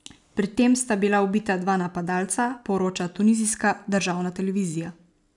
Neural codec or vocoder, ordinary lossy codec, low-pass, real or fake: none; none; 10.8 kHz; real